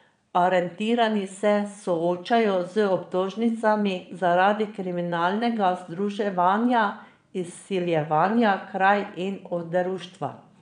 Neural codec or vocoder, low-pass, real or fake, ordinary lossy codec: vocoder, 22.05 kHz, 80 mel bands, Vocos; 9.9 kHz; fake; none